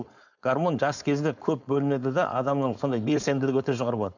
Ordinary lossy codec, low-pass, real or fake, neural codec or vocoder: none; 7.2 kHz; fake; codec, 16 kHz, 4.8 kbps, FACodec